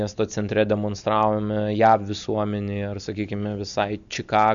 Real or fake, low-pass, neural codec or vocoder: real; 7.2 kHz; none